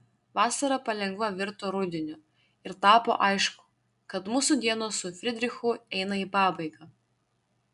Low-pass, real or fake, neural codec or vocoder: 10.8 kHz; real; none